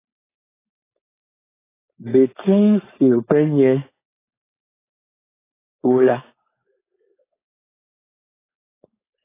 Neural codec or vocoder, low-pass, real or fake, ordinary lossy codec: codec, 16 kHz, 4.8 kbps, FACodec; 3.6 kHz; fake; AAC, 16 kbps